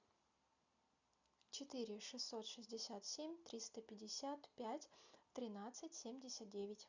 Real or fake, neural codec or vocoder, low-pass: real; none; 7.2 kHz